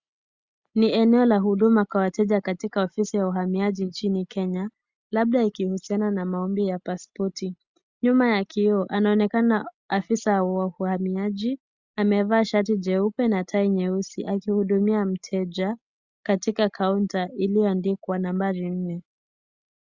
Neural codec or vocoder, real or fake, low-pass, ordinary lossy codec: none; real; 7.2 kHz; Opus, 64 kbps